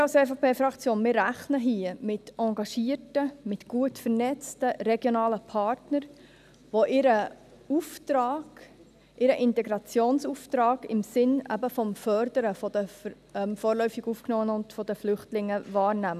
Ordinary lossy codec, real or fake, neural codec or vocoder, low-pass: none; real; none; 14.4 kHz